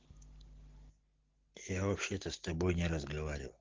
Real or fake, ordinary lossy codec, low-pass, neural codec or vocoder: real; Opus, 16 kbps; 7.2 kHz; none